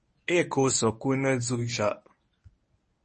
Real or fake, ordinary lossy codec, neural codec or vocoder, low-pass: fake; MP3, 32 kbps; codec, 24 kHz, 0.9 kbps, WavTokenizer, medium speech release version 1; 10.8 kHz